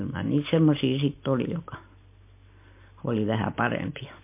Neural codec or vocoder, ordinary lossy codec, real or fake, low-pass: none; MP3, 24 kbps; real; 3.6 kHz